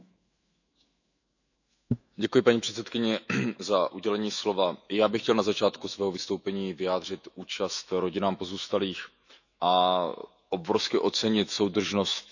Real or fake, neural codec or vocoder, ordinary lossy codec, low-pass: fake; autoencoder, 48 kHz, 128 numbers a frame, DAC-VAE, trained on Japanese speech; none; 7.2 kHz